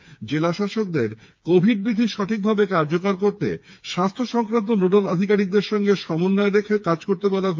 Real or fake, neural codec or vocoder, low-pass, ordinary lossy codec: fake; codec, 16 kHz, 4 kbps, FreqCodec, smaller model; 7.2 kHz; MP3, 48 kbps